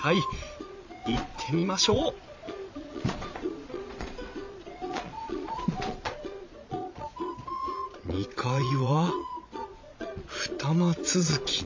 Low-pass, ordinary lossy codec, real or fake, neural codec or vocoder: 7.2 kHz; AAC, 48 kbps; fake; vocoder, 22.05 kHz, 80 mel bands, Vocos